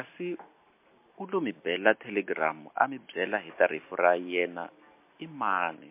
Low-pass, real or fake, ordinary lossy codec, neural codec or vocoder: 3.6 kHz; real; MP3, 24 kbps; none